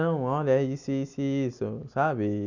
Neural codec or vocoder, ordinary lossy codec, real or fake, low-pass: none; none; real; 7.2 kHz